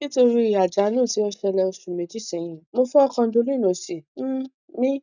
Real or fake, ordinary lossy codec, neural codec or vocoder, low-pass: real; none; none; 7.2 kHz